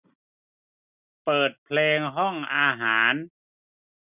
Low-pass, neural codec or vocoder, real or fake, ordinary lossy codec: 3.6 kHz; none; real; none